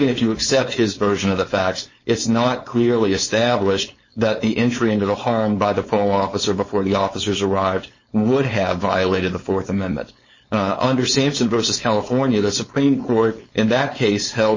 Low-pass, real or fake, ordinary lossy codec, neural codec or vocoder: 7.2 kHz; fake; MP3, 32 kbps; codec, 16 kHz, 4.8 kbps, FACodec